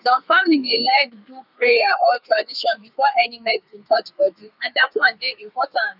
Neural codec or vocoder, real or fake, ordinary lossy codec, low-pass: codec, 32 kHz, 1.9 kbps, SNAC; fake; none; 5.4 kHz